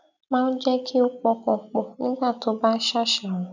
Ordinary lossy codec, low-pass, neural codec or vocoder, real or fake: none; 7.2 kHz; none; real